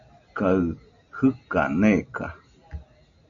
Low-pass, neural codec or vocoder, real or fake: 7.2 kHz; none; real